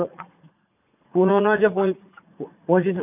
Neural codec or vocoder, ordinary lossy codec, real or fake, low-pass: vocoder, 44.1 kHz, 80 mel bands, Vocos; none; fake; 3.6 kHz